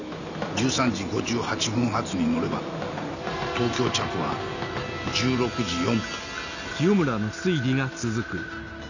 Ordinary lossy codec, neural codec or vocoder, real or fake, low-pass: none; none; real; 7.2 kHz